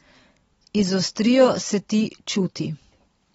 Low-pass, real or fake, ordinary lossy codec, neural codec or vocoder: 19.8 kHz; real; AAC, 24 kbps; none